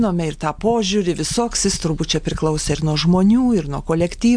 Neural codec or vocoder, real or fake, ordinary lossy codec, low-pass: none; real; MP3, 64 kbps; 9.9 kHz